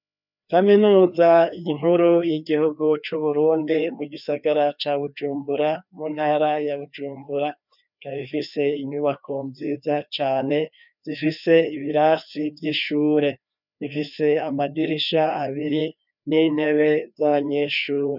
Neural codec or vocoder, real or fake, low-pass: codec, 16 kHz, 2 kbps, FreqCodec, larger model; fake; 5.4 kHz